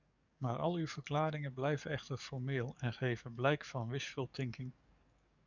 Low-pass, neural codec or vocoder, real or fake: 7.2 kHz; codec, 44.1 kHz, 7.8 kbps, DAC; fake